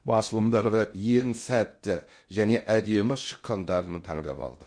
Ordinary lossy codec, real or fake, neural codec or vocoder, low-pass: MP3, 48 kbps; fake; codec, 16 kHz in and 24 kHz out, 0.6 kbps, FocalCodec, streaming, 2048 codes; 9.9 kHz